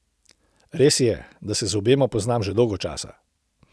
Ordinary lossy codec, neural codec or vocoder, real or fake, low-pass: none; none; real; none